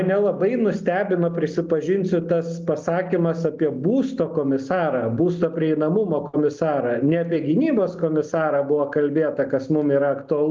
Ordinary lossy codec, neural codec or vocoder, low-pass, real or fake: Opus, 32 kbps; none; 7.2 kHz; real